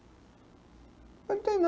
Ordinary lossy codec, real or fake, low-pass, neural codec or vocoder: none; real; none; none